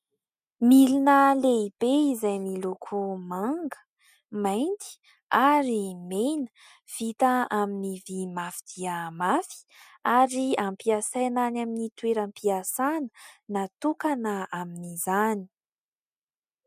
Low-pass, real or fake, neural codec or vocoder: 14.4 kHz; real; none